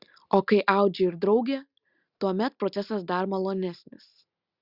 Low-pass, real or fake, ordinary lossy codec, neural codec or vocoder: 5.4 kHz; real; Opus, 64 kbps; none